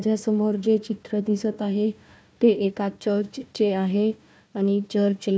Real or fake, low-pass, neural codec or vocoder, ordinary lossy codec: fake; none; codec, 16 kHz, 1 kbps, FunCodec, trained on Chinese and English, 50 frames a second; none